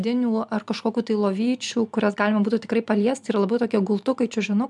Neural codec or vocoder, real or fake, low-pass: none; real; 10.8 kHz